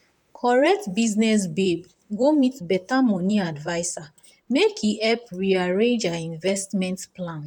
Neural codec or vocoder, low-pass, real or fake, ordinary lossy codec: vocoder, 44.1 kHz, 128 mel bands, Pupu-Vocoder; 19.8 kHz; fake; none